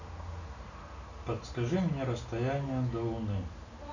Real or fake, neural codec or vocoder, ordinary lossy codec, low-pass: real; none; none; 7.2 kHz